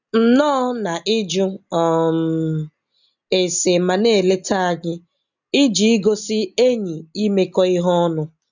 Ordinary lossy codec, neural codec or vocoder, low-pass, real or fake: none; none; 7.2 kHz; real